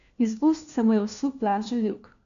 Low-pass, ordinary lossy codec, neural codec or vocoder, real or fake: 7.2 kHz; none; codec, 16 kHz, 1 kbps, FunCodec, trained on LibriTTS, 50 frames a second; fake